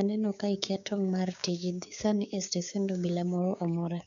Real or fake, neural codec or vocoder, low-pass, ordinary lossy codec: fake; codec, 16 kHz, 6 kbps, DAC; 7.2 kHz; none